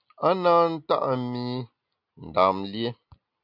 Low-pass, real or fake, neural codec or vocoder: 5.4 kHz; real; none